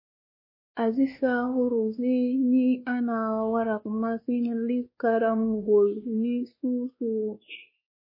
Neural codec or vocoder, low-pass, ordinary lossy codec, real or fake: codec, 16 kHz, 2 kbps, X-Codec, WavLM features, trained on Multilingual LibriSpeech; 5.4 kHz; MP3, 24 kbps; fake